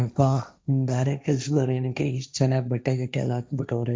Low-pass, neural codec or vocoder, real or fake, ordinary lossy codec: none; codec, 16 kHz, 1.1 kbps, Voila-Tokenizer; fake; none